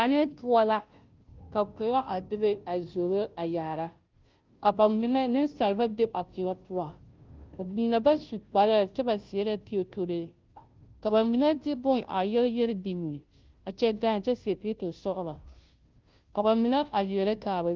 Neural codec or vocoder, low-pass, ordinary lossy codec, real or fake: codec, 16 kHz, 0.5 kbps, FunCodec, trained on Chinese and English, 25 frames a second; 7.2 kHz; Opus, 32 kbps; fake